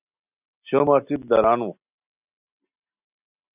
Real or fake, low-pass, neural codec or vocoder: real; 3.6 kHz; none